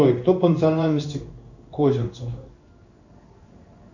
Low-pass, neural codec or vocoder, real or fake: 7.2 kHz; codec, 16 kHz in and 24 kHz out, 1 kbps, XY-Tokenizer; fake